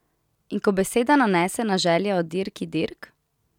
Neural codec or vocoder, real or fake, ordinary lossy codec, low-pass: none; real; none; 19.8 kHz